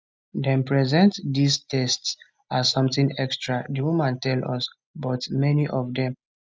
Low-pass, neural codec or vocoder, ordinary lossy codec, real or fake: none; none; none; real